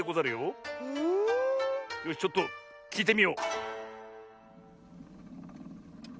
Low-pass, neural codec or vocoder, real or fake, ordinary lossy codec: none; none; real; none